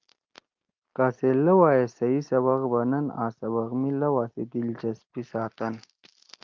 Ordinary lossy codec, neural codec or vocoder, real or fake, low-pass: Opus, 32 kbps; none; real; 7.2 kHz